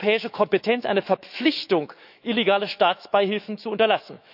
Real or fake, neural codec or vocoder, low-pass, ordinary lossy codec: fake; autoencoder, 48 kHz, 128 numbers a frame, DAC-VAE, trained on Japanese speech; 5.4 kHz; none